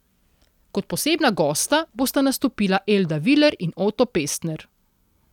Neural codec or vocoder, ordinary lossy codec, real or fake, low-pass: none; none; real; 19.8 kHz